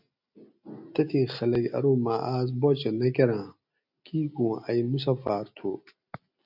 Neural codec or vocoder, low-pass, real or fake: none; 5.4 kHz; real